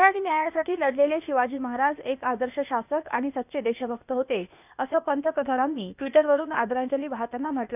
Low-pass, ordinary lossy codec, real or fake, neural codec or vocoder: 3.6 kHz; none; fake; codec, 16 kHz, 0.8 kbps, ZipCodec